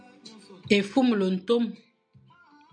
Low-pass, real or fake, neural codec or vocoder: 9.9 kHz; real; none